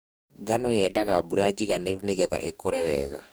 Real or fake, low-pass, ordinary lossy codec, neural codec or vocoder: fake; none; none; codec, 44.1 kHz, 2.6 kbps, DAC